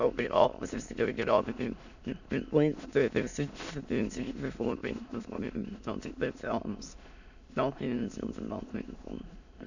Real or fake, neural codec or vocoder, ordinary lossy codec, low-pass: fake; autoencoder, 22.05 kHz, a latent of 192 numbers a frame, VITS, trained on many speakers; none; 7.2 kHz